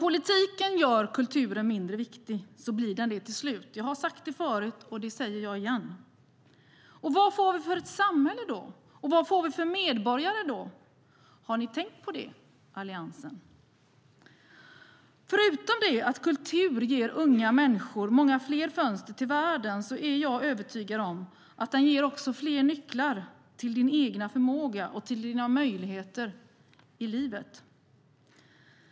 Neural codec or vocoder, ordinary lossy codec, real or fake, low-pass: none; none; real; none